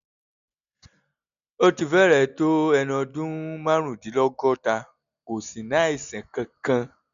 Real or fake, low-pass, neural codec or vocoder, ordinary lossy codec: real; 7.2 kHz; none; none